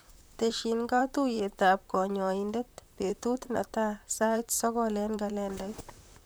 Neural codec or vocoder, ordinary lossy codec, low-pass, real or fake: vocoder, 44.1 kHz, 128 mel bands, Pupu-Vocoder; none; none; fake